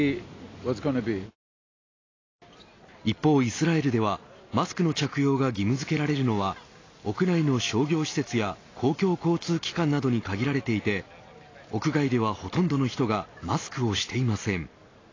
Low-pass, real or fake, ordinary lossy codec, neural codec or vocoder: 7.2 kHz; real; AAC, 32 kbps; none